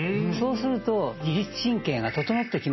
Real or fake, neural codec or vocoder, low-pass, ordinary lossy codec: real; none; 7.2 kHz; MP3, 24 kbps